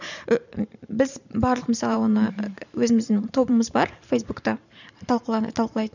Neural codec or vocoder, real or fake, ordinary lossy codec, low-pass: vocoder, 44.1 kHz, 128 mel bands every 512 samples, BigVGAN v2; fake; none; 7.2 kHz